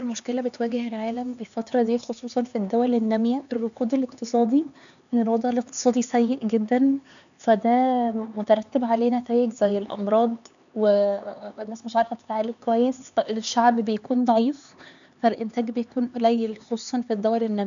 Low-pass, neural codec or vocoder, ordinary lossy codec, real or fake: 7.2 kHz; codec, 16 kHz, 4 kbps, X-Codec, HuBERT features, trained on LibriSpeech; none; fake